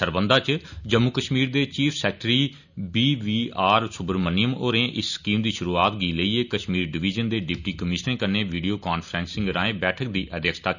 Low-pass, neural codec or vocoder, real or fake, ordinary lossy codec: 7.2 kHz; none; real; none